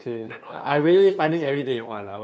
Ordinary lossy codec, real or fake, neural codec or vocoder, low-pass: none; fake; codec, 16 kHz, 2 kbps, FunCodec, trained on LibriTTS, 25 frames a second; none